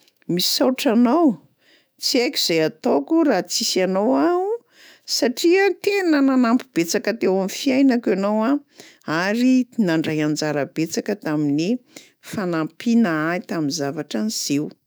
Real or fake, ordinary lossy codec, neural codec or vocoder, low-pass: fake; none; autoencoder, 48 kHz, 128 numbers a frame, DAC-VAE, trained on Japanese speech; none